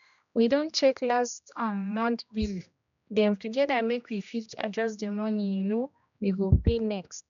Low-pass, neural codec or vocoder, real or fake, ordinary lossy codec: 7.2 kHz; codec, 16 kHz, 1 kbps, X-Codec, HuBERT features, trained on general audio; fake; none